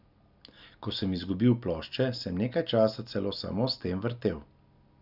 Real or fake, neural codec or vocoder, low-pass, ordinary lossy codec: real; none; 5.4 kHz; none